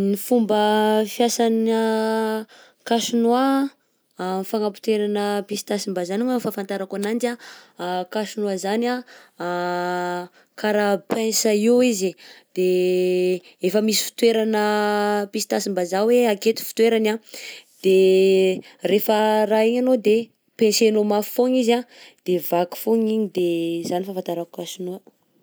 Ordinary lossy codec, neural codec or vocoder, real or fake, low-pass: none; none; real; none